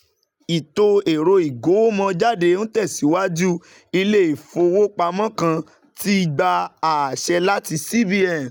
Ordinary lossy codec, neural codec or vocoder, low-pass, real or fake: none; none; 19.8 kHz; real